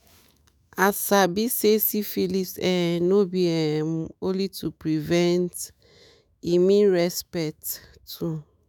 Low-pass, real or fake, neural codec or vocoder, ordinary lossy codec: none; fake; autoencoder, 48 kHz, 128 numbers a frame, DAC-VAE, trained on Japanese speech; none